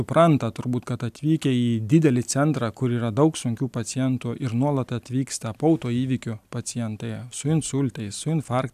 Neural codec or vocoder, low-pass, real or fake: none; 14.4 kHz; real